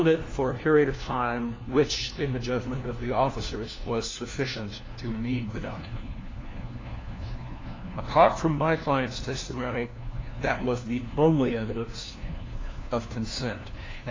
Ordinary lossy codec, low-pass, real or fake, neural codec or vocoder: AAC, 32 kbps; 7.2 kHz; fake; codec, 16 kHz, 1 kbps, FunCodec, trained on LibriTTS, 50 frames a second